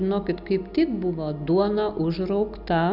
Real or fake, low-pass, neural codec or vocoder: real; 5.4 kHz; none